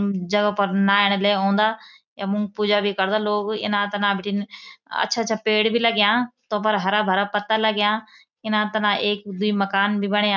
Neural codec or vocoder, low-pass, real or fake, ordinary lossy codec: none; 7.2 kHz; real; none